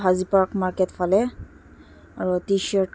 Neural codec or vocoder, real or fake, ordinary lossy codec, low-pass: none; real; none; none